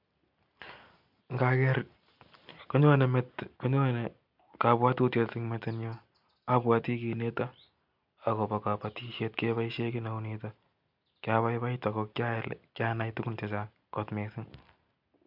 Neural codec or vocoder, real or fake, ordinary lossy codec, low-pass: none; real; MP3, 48 kbps; 5.4 kHz